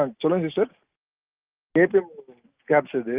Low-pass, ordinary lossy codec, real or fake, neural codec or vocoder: 3.6 kHz; Opus, 32 kbps; real; none